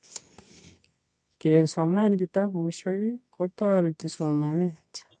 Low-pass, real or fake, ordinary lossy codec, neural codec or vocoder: 10.8 kHz; fake; MP3, 64 kbps; codec, 24 kHz, 0.9 kbps, WavTokenizer, medium music audio release